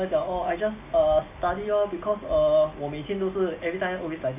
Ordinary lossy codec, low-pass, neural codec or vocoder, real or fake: MP3, 24 kbps; 3.6 kHz; none; real